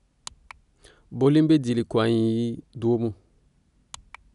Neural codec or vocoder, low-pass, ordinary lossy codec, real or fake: none; 10.8 kHz; none; real